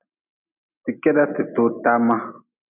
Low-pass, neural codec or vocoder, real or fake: 3.6 kHz; none; real